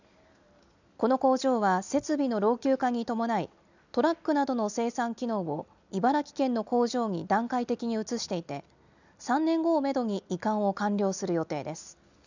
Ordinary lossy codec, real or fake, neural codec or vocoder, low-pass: none; real; none; 7.2 kHz